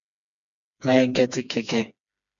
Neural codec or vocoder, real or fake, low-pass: codec, 16 kHz, 2 kbps, FreqCodec, smaller model; fake; 7.2 kHz